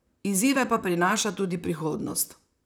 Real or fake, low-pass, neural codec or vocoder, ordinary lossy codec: fake; none; vocoder, 44.1 kHz, 128 mel bands, Pupu-Vocoder; none